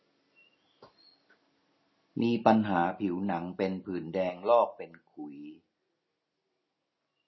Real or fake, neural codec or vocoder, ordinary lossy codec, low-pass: real; none; MP3, 24 kbps; 7.2 kHz